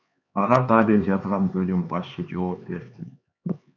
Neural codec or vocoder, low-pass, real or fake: codec, 16 kHz, 4 kbps, X-Codec, HuBERT features, trained on LibriSpeech; 7.2 kHz; fake